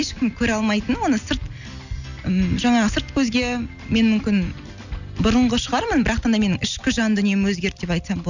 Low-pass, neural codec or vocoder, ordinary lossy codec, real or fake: 7.2 kHz; none; none; real